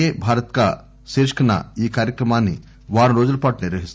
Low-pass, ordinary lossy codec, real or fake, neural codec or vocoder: none; none; real; none